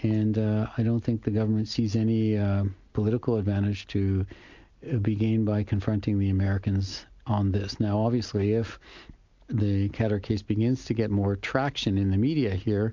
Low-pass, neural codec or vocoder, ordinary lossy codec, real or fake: 7.2 kHz; none; MP3, 64 kbps; real